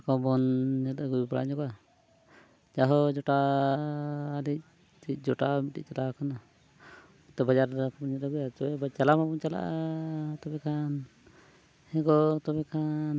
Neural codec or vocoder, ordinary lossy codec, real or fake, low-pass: none; none; real; none